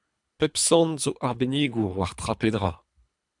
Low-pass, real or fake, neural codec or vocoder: 10.8 kHz; fake; codec, 24 kHz, 3 kbps, HILCodec